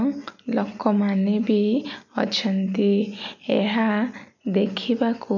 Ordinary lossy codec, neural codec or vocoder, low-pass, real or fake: none; none; 7.2 kHz; real